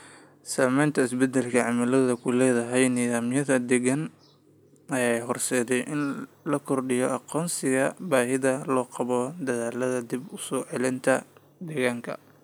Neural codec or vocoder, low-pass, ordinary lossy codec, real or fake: none; none; none; real